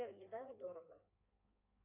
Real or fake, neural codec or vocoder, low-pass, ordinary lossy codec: fake; codec, 16 kHz in and 24 kHz out, 1.1 kbps, FireRedTTS-2 codec; 3.6 kHz; AAC, 32 kbps